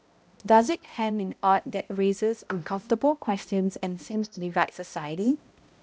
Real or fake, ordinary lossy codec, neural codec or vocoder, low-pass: fake; none; codec, 16 kHz, 0.5 kbps, X-Codec, HuBERT features, trained on balanced general audio; none